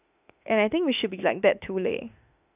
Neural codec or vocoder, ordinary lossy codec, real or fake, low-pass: autoencoder, 48 kHz, 32 numbers a frame, DAC-VAE, trained on Japanese speech; none; fake; 3.6 kHz